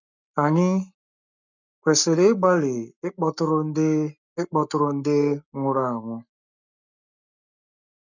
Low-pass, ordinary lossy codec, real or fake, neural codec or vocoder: 7.2 kHz; none; fake; codec, 44.1 kHz, 7.8 kbps, Pupu-Codec